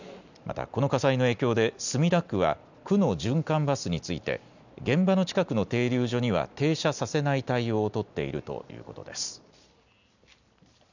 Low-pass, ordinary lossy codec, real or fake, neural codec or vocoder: 7.2 kHz; none; real; none